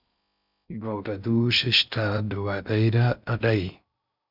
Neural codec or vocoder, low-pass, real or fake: codec, 16 kHz in and 24 kHz out, 0.6 kbps, FocalCodec, streaming, 4096 codes; 5.4 kHz; fake